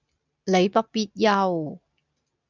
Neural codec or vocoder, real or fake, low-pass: none; real; 7.2 kHz